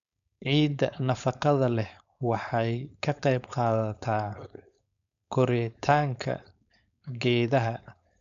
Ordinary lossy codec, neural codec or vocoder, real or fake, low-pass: none; codec, 16 kHz, 4.8 kbps, FACodec; fake; 7.2 kHz